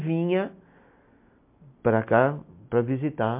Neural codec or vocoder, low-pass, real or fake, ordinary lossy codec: none; 3.6 kHz; real; MP3, 32 kbps